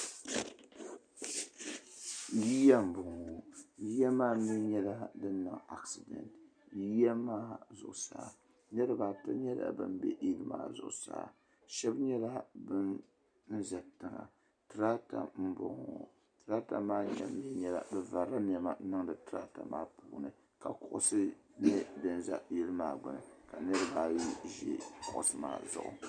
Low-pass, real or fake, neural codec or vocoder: 9.9 kHz; real; none